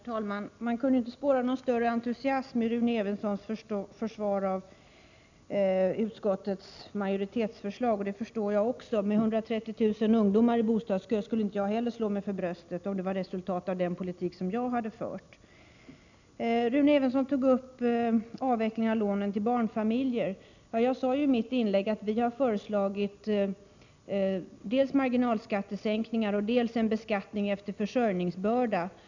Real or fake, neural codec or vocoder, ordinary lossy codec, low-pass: real; none; none; 7.2 kHz